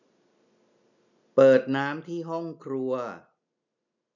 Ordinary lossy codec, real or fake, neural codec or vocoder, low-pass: none; real; none; 7.2 kHz